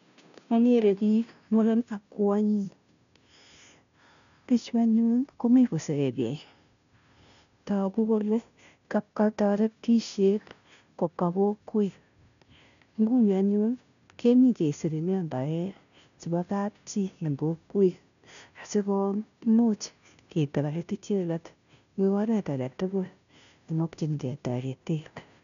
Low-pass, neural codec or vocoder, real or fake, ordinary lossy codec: 7.2 kHz; codec, 16 kHz, 0.5 kbps, FunCodec, trained on Chinese and English, 25 frames a second; fake; none